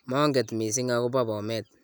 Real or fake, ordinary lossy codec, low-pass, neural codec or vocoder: real; none; none; none